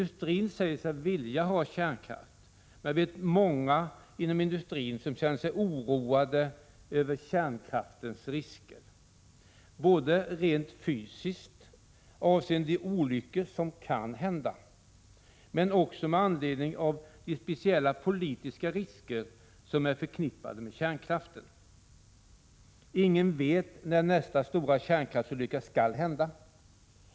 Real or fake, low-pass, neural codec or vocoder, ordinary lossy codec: real; none; none; none